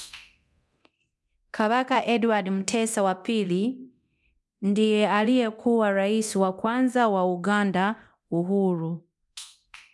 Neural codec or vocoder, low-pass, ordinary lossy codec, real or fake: codec, 24 kHz, 0.9 kbps, DualCodec; none; none; fake